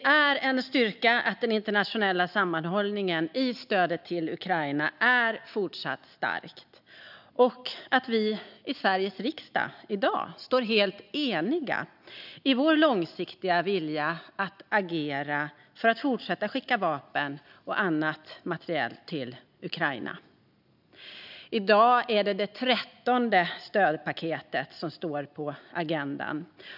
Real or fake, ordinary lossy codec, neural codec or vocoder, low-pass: real; none; none; 5.4 kHz